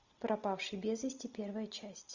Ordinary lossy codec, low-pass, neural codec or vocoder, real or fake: Opus, 64 kbps; 7.2 kHz; none; real